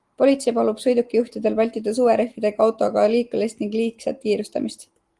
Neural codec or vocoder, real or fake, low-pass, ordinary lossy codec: none; real; 10.8 kHz; Opus, 24 kbps